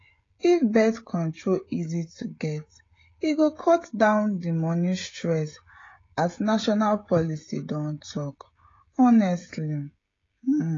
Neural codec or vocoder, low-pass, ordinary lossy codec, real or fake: codec, 16 kHz, 16 kbps, FreqCodec, smaller model; 7.2 kHz; AAC, 32 kbps; fake